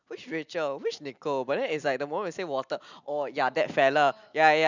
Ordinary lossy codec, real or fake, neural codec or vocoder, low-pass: none; real; none; 7.2 kHz